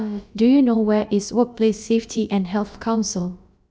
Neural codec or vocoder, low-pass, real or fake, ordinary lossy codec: codec, 16 kHz, about 1 kbps, DyCAST, with the encoder's durations; none; fake; none